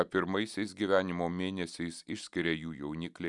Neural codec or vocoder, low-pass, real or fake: none; 10.8 kHz; real